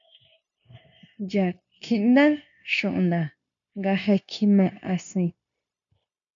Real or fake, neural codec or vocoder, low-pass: fake; codec, 16 kHz, 0.9 kbps, LongCat-Audio-Codec; 7.2 kHz